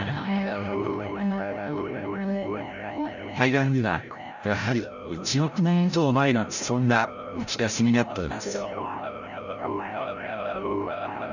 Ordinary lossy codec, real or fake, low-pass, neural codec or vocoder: none; fake; 7.2 kHz; codec, 16 kHz, 0.5 kbps, FreqCodec, larger model